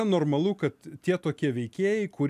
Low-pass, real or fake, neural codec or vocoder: 14.4 kHz; real; none